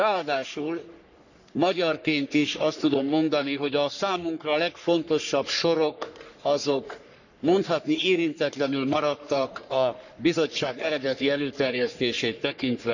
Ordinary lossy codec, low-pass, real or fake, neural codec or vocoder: none; 7.2 kHz; fake; codec, 44.1 kHz, 3.4 kbps, Pupu-Codec